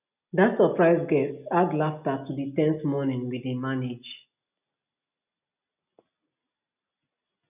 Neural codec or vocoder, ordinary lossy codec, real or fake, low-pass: none; none; real; 3.6 kHz